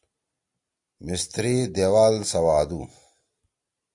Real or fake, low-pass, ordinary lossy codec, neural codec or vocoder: real; 10.8 kHz; AAC, 64 kbps; none